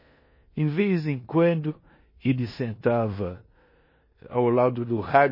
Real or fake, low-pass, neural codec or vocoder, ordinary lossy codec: fake; 5.4 kHz; codec, 16 kHz in and 24 kHz out, 0.9 kbps, LongCat-Audio-Codec, four codebook decoder; MP3, 24 kbps